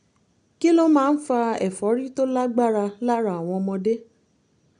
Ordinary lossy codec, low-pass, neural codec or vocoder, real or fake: MP3, 64 kbps; 9.9 kHz; none; real